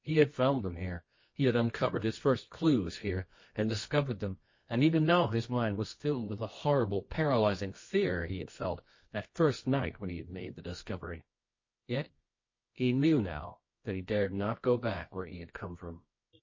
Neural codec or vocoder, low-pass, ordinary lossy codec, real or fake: codec, 24 kHz, 0.9 kbps, WavTokenizer, medium music audio release; 7.2 kHz; MP3, 32 kbps; fake